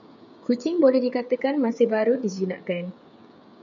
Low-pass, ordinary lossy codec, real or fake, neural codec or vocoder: 7.2 kHz; AAC, 64 kbps; fake; codec, 16 kHz, 16 kbps, FreqCodec, smaller model